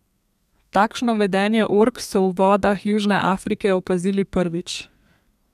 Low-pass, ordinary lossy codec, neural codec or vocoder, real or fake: 14.4 kHz; none; codec, 32 kHz, 1.9 kbps, SNAC; fake